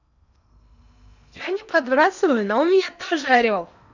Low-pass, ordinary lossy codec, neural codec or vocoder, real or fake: 7.2 kHz; none; codec, 16 kHz in and 24 kHz out, 0.8 kbps, FocalCodec, streaming, 65536 codes; fake